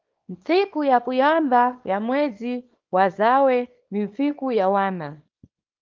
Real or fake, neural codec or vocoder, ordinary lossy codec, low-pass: fake; codec, 24 kHz, 0.9 kbps, WavTokenizer, small release; Opus, 24 kbps; 7.2 kHz